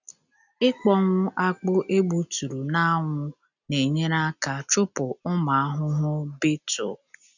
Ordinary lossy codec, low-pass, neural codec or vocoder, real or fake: none; 7.2 kHz; none; real